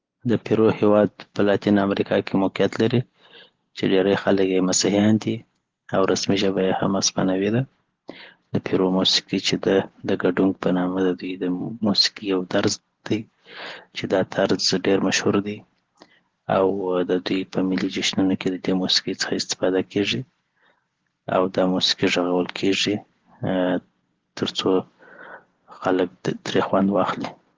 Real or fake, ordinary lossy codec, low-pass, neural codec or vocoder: real; Opus, 16 kbps; 7.2 kHz; none